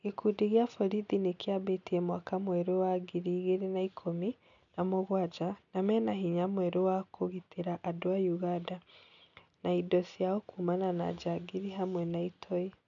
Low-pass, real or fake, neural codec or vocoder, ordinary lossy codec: 7.2 kHz; real; none; none